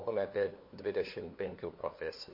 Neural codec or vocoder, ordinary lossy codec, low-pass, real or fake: codec, 16 kHz, 2 kbps, FunCodec, trained on LibriTTS, 25 frames a second; MP3, 32 kbps; 5.4 kHz; fake